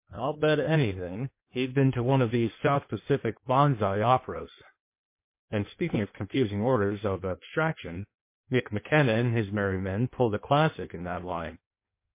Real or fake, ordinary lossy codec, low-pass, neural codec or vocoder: fake; MP3, 24 kbps; 3.6 kHz; codec, 16 kHz in and 24 kHz out, 1.1 kbps, FireRedTTS-2 codec